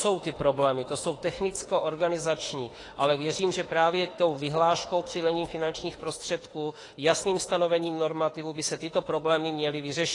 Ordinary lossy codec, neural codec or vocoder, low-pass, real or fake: AAC, 32 kbps; autoencoder, 48 kHz, 32 numbers a frame, DAC-VAE, trained on Japanese speech; 10.8 kHz; fake